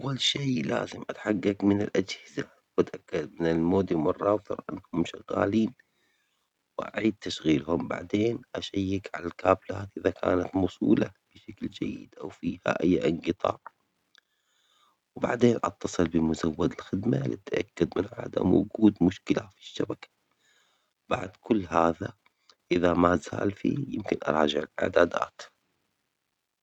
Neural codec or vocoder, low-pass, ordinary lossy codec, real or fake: none; 19.8 kHz; none; real